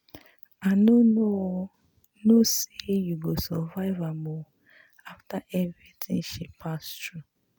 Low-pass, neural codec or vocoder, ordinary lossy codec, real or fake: none; none; none; real